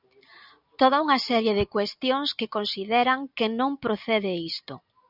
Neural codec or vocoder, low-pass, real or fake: none; 5.4 kHz; real